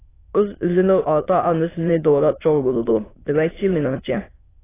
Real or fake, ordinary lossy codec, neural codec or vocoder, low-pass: fake; AAC, 16 kbps; autoencoder, 22.05 kHz, a latent of 192 numbers a frame, VITS, trained on many speakers; 3.6 kHz